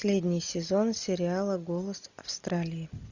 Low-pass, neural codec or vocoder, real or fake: 7.2 kHz; none; real